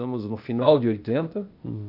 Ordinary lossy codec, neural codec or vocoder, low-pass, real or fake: none; codec, 16 kHz, 0.8 kbps, ZipCodec; 5.4 kHz; fake